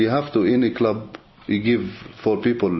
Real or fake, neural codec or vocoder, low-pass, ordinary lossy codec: real; none; 7.2 kHz; MP3, 24 kbps